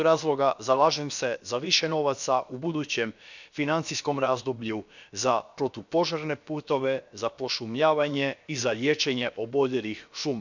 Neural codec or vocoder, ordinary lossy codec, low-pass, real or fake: codec, 16 kHz, about 1 kbps, DyCAST, with the encoder's durations; none; 7.2 kHz; fake